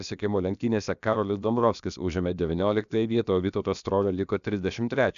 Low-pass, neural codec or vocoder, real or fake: 7.2 kHz; codec, 16 kHz, about 1 kbps, DyCAST, with the encoder's durations; fake